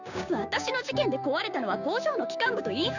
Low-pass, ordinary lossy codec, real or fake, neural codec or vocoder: 7.2 kHz; none; fake; codec, 16 kHz, 6 kbps, DAC